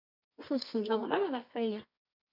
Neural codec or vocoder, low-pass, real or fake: codec, 24 kHz, 0.9 kbps, WavTokenizer, medium music audio release; 5.4 kHz; fake